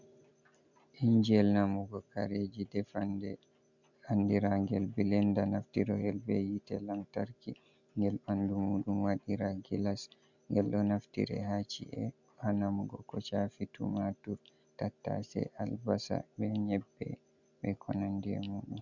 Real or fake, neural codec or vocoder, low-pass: real; none; 7.2 kHz